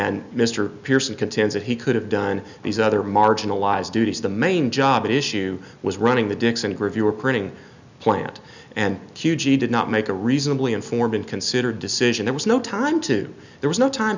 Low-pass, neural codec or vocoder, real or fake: 7.2 kHz; none; real